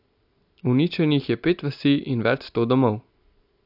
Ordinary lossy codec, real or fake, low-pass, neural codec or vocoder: AAC, 48 kbps; real; 5.4 kHz; none